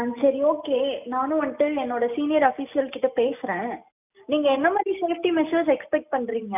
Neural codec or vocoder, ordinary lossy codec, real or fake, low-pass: none; none; real; 3.6 kHz